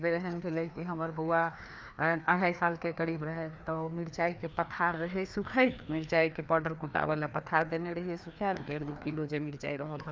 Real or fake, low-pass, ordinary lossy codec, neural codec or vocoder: fake; none; none; codec, 16 kHz, 2 kbps, FreqCodec, larger model